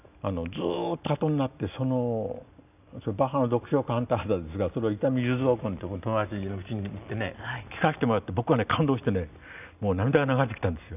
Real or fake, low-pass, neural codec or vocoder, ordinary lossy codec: real; 3.6 kHz; none; none